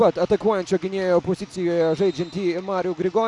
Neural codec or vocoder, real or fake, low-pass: none; real; 10.8 kHz